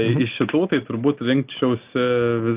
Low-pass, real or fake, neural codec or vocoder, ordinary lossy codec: 3.6 kHz; real; none; Opus, 24 kbps